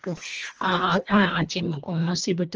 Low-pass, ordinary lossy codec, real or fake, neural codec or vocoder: 7.2 kHz; Opus, 24 kbps; fake; codec, 24 kHz, 1.5 kbps, HILCodec